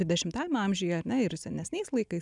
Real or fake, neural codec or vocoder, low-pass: real; none; 10.8 kHz